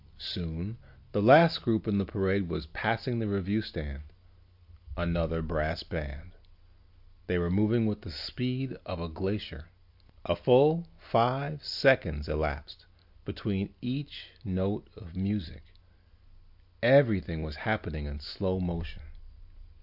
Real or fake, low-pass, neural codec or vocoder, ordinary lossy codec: real; 5.4 kHz; none; AAC, 48 kbps